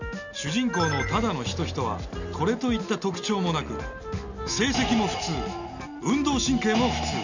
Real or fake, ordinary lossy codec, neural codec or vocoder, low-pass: real; none; none; 7.2 kHz